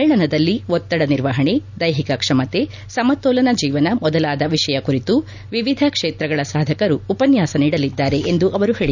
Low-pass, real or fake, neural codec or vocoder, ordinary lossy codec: 7.2 kHz; real; none; none